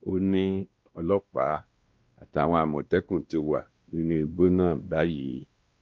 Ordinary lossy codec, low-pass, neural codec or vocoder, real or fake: Opus, 32 kbps; 7.2 kHz; codec, 16 kHz, 1 kbps, X-Codec, WavLM features, trained on Multilingual LibriSpeech; fake